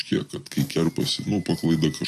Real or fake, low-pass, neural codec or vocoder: real; 14.4 kHz; none